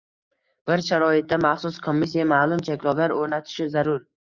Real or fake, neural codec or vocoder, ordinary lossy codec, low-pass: fake; codec, 44.1 kHz, 7.8 kbps, DAC; Opus, 64 kbps; 7.2 kHz